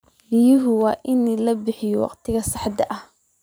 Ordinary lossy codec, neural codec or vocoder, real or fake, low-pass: none; vocoder, 44.1 kHz, 128 mel bands every 512 samples, BigVGAN v2; fake; none